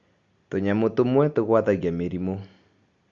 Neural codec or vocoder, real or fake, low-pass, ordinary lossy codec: none; real; 7.2 kHz; Opus, 64 kbps